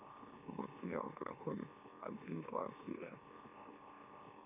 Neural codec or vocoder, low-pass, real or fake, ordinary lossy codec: autoencoder, 44.1 kHz, a latent of 192 numbers a frame, MeloTTS; 3.6 kHz; fake; AAC, 32 kbps